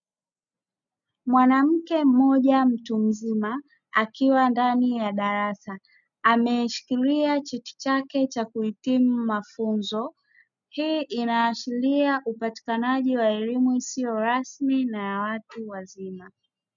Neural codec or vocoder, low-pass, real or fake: none; 7.2 kHz; real